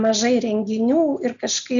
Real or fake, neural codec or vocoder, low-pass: real; none; 7.2 kHz